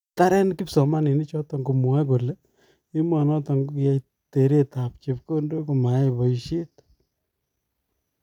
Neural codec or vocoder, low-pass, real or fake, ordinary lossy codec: none; 19.8 kHz; real; none